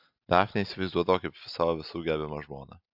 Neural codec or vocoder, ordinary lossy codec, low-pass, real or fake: none; AAC, 48 kbps; 5.4 kHz; real